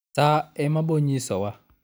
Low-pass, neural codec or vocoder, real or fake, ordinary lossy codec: none; none; real; none